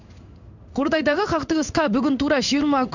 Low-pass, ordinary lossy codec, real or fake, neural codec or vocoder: 7.2 kHz; none; fake; codec, 16 kHz in and 24 kHz out, 1 kbps, XY-Tokenizer